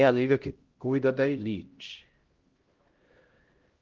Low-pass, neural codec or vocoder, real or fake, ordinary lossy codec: 7.2 kHz; codec, 16 kHz, 0.5 kbps, X-Codec, HuBERT features, trained on LibriSpeech; fake; Opus, 16 kbps